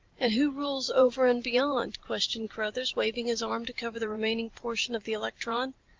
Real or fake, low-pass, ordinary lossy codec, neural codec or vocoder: fake; 7.2 kHz; Opus, 32 kbps; vocoder, 44.1 kHz, 128 mel bands every 512 samples, BigVGAN v2